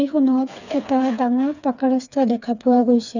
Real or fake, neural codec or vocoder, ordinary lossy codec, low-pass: fake; codec, 16 kHz, 4 kbps, FreqCodec, smaller model; none; 7.2 kHz